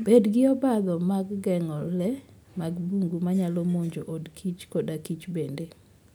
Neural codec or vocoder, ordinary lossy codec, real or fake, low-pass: none; none; real; none